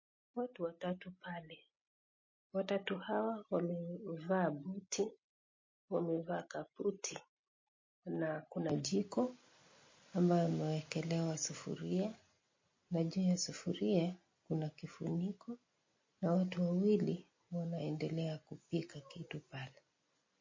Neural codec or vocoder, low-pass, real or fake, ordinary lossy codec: none; 7.2 kHz; real; MP3, 32 kbps